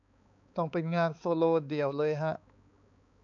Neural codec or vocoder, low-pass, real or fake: codec, 16 kHz, 4 kbps, X-Codec, HuBERT features, trained on balanced general audio; 7.2 kHz; fake